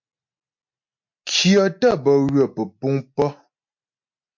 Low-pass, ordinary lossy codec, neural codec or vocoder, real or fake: 7.2 kHz; MP3, 48 kbps; none; real